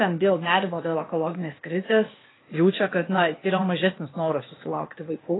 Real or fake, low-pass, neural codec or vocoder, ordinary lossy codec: fake; 7.2 kHz; codec, 16 kHz, 0.8 kbps, ZipCodec; AAC, 16 kbps